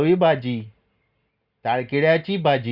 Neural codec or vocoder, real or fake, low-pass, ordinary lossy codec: none; real; 5.4 kHz; Opus, 64 kbps